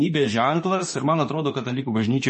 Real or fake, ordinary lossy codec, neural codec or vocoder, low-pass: fake; MP3, 32 kbps; autoencoder, 48 kHz, 32 numbers a frame, DAC-VAE, trained on Japanese speech; 10.8 kHz